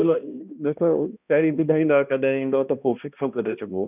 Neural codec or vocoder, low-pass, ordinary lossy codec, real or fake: codec, 16 kHz, 1 kbps, X-Codec, HuBERT features, trained on balanced general audio; 3.6 kHz; none; fake